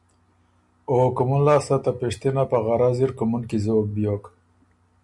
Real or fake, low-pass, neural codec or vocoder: real; 10.8 kHz; none